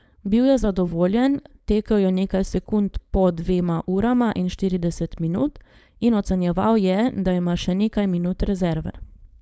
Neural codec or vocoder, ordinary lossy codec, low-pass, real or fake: codec, 16 kHz, 4.8 kbps, FACodec; none; none; fake